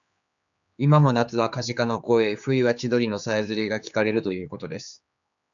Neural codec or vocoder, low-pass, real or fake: codec, 16 kHz, 4 kbps, X-Codec, HuBERT features, trained on general audio; 7.2 kHz; fake